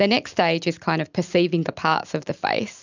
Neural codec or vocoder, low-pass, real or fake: none; 7.2 kHz; real